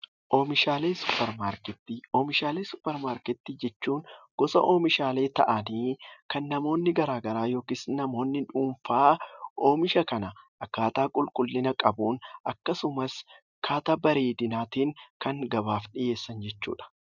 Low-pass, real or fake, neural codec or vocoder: 7.2 kHz; real; none